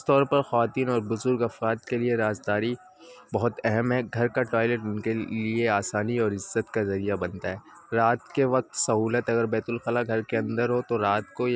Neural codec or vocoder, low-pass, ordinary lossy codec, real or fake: none; none; none; real